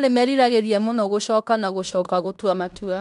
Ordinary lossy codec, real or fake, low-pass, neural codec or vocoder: none; fake; 10.8 kHz; codec, 16 kHz in and 24 kHz out, 0.9 kbps, LongCat-Audio-Codec, fine tuned four codebook decoder